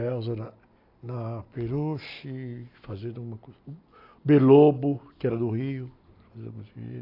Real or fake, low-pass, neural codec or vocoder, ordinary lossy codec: real; 5.4 kHz; none; AAC, 32 kbps